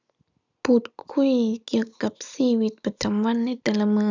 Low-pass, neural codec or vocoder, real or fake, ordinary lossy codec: 7.2 kHz; none; real; none